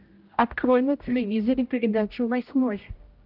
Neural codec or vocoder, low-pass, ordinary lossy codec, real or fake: codec, 16 kHz, 0.5 kbps, X-Codec, HuBERT features, trained on general audio; 5.4 kHz; Opus, 32 kbps; fake